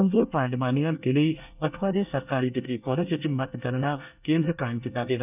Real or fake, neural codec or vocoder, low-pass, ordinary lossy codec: fake; codec, 24 kHz, 1 kbps, SNAC; 3.6 kHz; none